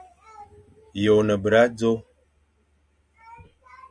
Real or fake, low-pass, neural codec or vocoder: real; 9.9 kHz; none